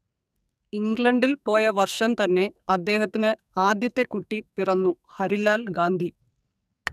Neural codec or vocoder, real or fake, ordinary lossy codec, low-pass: codec, 44.1 kHz, 2.6 kbps, SNAC; fake; none; 14.4 kHz